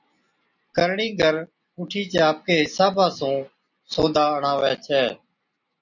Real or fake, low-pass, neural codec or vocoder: real; 7.2 kHz; none